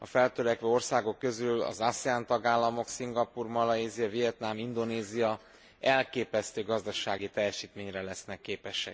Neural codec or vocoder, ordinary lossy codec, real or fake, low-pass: none; none; real; none